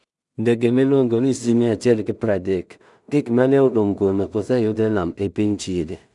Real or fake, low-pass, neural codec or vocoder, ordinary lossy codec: fake; 10.8 kHz; codec, 16 kHz in and 24 kHz out, 0.4 kbps, LongCat-Audio-Codec, two codebook decoder; none